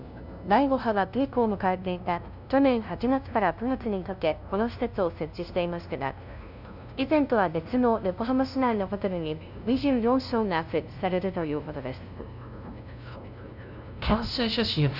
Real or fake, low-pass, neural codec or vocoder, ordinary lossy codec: fake; 5.4 kHz; codec, 16 kHz, 0.5 kbps, FunCodec, trained on LibriTTS, 25 frames a second; none